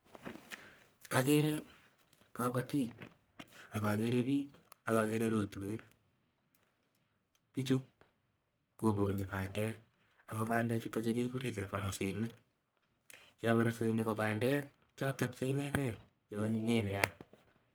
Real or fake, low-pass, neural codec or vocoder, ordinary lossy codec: fake; none; codec, 44.1 kHz, 1.7 kbps, Pupu-Codec; none